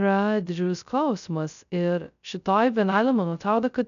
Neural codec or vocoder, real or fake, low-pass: codec, 16 kHz, 0.2 kbps, FocalCodec; fake; 7.2 kHz